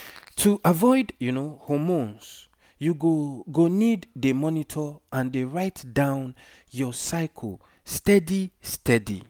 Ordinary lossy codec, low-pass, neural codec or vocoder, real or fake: none; none; none; real